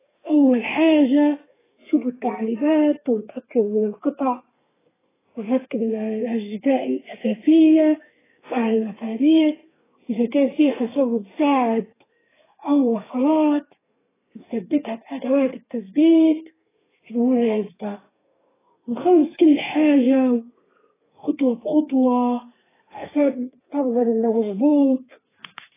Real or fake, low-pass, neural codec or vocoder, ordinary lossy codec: fake; 3.6 kHz; codec, 32 kHz, 1.9 kbps, SNAC; AAC, 16 kbps